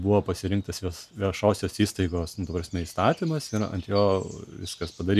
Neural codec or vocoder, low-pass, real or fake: none; 14.4 kHz; real